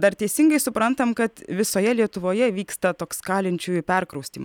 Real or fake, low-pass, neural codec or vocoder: real; 19.8 kHz; none